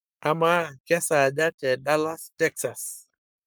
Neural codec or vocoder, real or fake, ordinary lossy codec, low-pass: codec, 44.1 kHz, 7.8 kbps, Pupu-Codec; fake; none; none